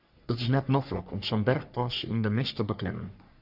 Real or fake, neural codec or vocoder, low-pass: fake; codec, 44.1 kHz, 3.4 kbps, Pupu-Codec; 5.4 kHz